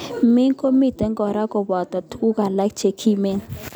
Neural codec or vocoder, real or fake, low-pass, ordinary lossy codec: none; real; none; none